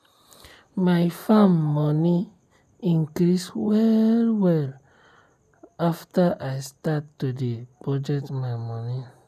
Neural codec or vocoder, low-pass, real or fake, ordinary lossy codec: none; 14.4 kHz; real; none